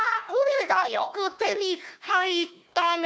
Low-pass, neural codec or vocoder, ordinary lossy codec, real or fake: none; codec, 16 kHz, 1 kbps, FunCodec, trained on Chinese and English, 50 frames a second; none; fake